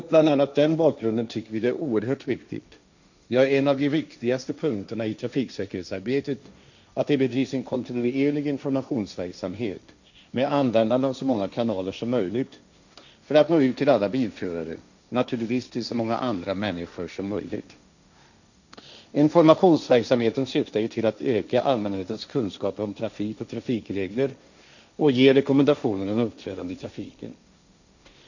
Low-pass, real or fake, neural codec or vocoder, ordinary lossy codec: 7.2 kHz; fake; codec, 16 kHz, 1.1 kbps, Voila-Tokenizer; none